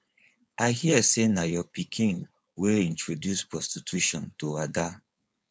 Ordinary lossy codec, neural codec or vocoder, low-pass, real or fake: none; codec, 16 kHz, 4.8 kbps, FACodec; none; fake